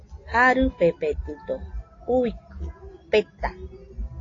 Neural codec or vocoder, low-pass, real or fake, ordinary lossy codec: none; 7.2 kHz; real; AAC, 32 kbps